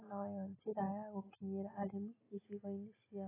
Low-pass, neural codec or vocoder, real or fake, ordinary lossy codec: 3.6 kHz; none; real; AAC, 16 kbps